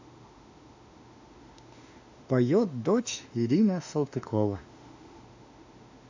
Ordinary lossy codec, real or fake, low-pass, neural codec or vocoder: AAC, 48 kbps; fake; 7.2 kHz; autoencoder, 48 kHz, 32 numbers a frame, DAC-VAE, trained on Japanese speech